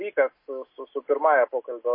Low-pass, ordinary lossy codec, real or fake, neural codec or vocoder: 5.4 kHz; MP3, 24 kbps; real; none